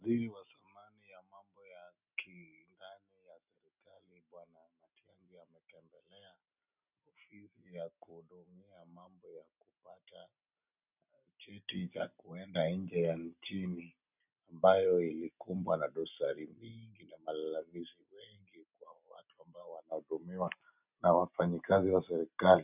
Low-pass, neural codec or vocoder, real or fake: 3.6 kHz; none; real